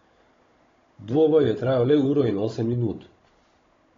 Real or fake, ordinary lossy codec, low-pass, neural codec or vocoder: fake; AAC, 32 kbps; 7.2 kHz; codec, 16 kHz, 16 kbps, FunCodec, trained on Chinese and English, 50 frames a second